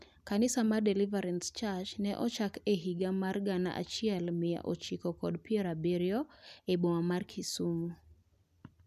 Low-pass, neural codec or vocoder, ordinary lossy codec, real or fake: 14.4 kHz; none; none; real